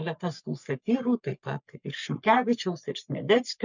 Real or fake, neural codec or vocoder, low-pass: fake; codec, 44.1 kHz, 3.4 kbps, Pupu-Codec; 7.2 kHz